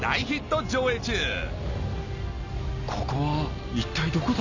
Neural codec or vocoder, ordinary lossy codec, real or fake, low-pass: none; none; real; 7.2 kHz